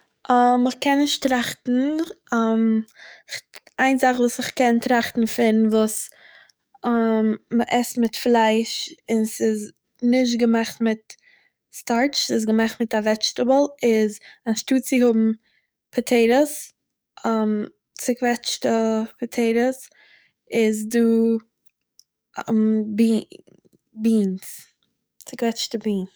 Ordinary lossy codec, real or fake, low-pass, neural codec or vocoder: none; fake; none; codec, 44.1 kHz, 7.8 kbps, DAC